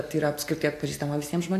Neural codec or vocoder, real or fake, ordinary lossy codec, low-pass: none; real; AAC, 64 kbps; 14.4 kHz